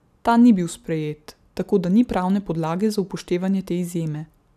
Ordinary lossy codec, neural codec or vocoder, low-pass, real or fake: none; none; 14.4 kHz; real